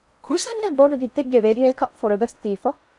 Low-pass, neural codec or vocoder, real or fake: 10.8 kHz; codec, 16 kHz in and 24 kHz out, 0.6 kbps, FocalCodec, streaming, 4096 codes; fake